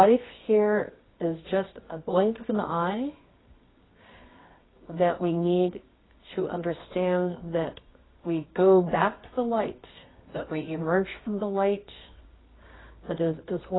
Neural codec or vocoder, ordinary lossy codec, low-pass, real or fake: codec, 24 kHz, 0.9 kbps, WavTokenizer, medium music audio release; AAC, 16 kbps; 7.2 kHz; fake